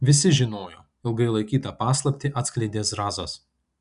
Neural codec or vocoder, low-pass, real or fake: none; 10.8 kHz; real